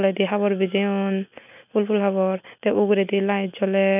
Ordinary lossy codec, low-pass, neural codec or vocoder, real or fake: none; 3.6 kHz; none; real